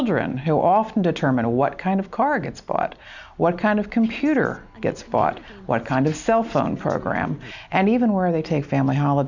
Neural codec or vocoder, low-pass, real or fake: none; 7.2 kHz; real